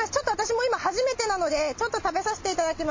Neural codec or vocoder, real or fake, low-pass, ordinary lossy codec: none; real; 7.2 kHz; MP3, 32 kbps